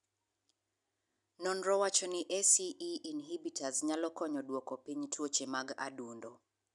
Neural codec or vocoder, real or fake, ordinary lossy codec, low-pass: none; real; none; 10.8 kHz